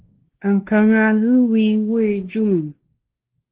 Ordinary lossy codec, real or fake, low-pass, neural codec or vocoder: Opus, 16 kbps; fake; 3.6 kHz; codec, 16 kHz, 2 kbps, X-Codec, WavLM features, trained on Multilingual LibriSpeech